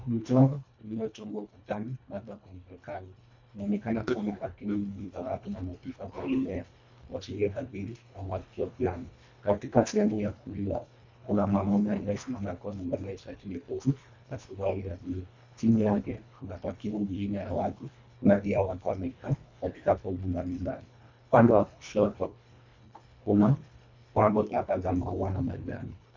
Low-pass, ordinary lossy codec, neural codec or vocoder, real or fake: 7.2 kHz; MP3, 64 kbps; codec, 24 kHz, 1.5 kbps, HILCodec; fake